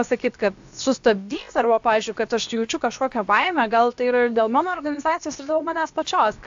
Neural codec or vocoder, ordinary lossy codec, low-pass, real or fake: codec, 16 kHz, about 1 kbps, DyCAST, with the encoder's durations; AAC, 64 kbps; 7.2 kHz; fake